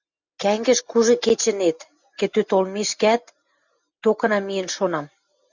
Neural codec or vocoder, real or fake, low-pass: none; real; 7.2 kHz